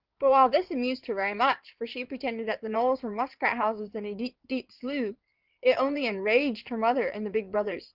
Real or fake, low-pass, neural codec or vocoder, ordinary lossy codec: fake; 5.4 kHz; codec, 16 kHz in and 24 kHz out, 2.2 kbps, FireRedTTS-2 codec; Opus, 24 kbps